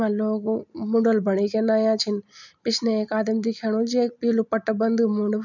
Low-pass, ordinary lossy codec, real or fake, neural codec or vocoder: 7.2 kHz; none; real; none